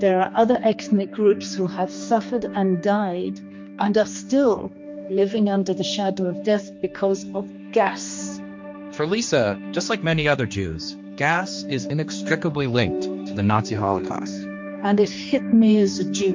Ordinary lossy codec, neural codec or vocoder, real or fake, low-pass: MP3, 48 kbps; codec, 16 kHz, 2 kbps, X-Codec, HuBERT features, trained on general audio; fake; 7.2 kHz